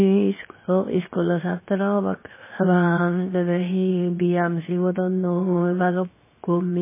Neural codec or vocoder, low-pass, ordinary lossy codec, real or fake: codec, 16 kHz, 0.7 kbps, FocalCodec; 3.6 kHz; MP3, 16 kbps; fake